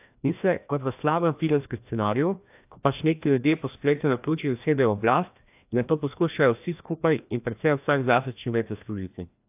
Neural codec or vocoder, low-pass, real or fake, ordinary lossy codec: codec, 16 kHz, 1 kbps, FreqCodec, larger model; 3.6 kHz; fake; AAC, 32 kbps